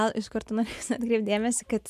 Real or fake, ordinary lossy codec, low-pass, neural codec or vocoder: real; MP3, 96 kbps; 14.4 kHz; none